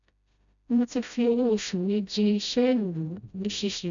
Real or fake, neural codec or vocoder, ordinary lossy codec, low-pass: fake; codec, 16 kHz, 0.5 kbps, FreqCodec, smaller model; none; 7.2 kHz